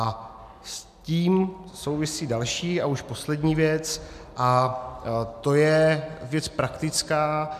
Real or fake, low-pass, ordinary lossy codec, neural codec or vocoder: real; 14.4 kHz; MP3, 96 kbps; none